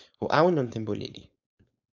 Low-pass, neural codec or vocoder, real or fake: 7.2 kHz; codec, 16 kHz, 4.8 kbps, FACodec; fake